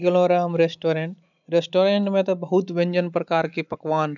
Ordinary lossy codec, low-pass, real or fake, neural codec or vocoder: none; 7.2 kHz; real; none